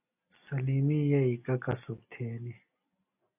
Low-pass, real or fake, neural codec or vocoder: 3.6 kHz; real; none